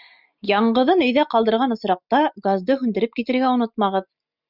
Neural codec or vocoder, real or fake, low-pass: none; real; 5.4 kHz